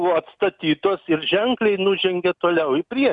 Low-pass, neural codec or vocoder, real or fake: 10.8 kHz; none; real